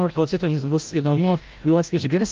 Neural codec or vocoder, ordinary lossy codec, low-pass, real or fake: codec, 16 kHz, 0.5 kbps, FreqCodec, larger model; Opus, 32 kbps; 7.2 kHz; fake